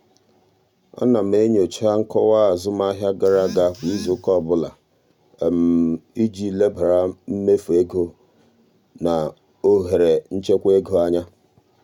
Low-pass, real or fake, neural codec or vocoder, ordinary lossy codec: 19.8 kHz; real; none; none